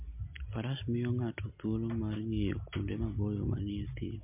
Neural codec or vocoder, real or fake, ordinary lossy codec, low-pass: none; real; MP3, 32 kbps; 3.6 kHz